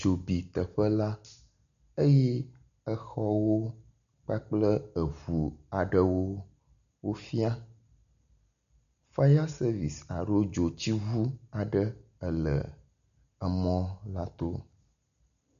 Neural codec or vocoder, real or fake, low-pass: none; real; 7.2 kHz